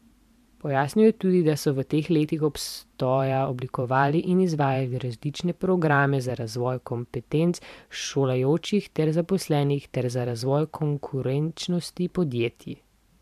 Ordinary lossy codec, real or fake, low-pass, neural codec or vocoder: MP3, 96 kbps; fake; 14.4 kHz; vocoder, 44.1 kHz, 128 mel bands every 512 samples, BigVGAN v2